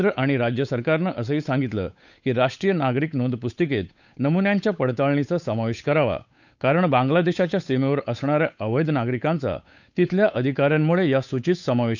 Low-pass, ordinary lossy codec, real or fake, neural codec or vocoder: 7.2 kHz; none; fake; codec, 16 kHz, 8 kbps, FunCodec, trained on Chinese and English, 25 frames a second